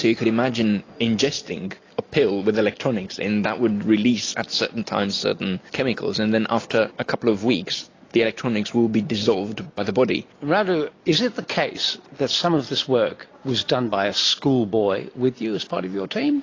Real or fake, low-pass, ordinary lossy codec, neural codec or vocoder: real; 7.2 kHz; AAC, 32 kbps; none